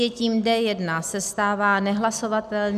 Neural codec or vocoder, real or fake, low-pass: none; real; 14.4 kHz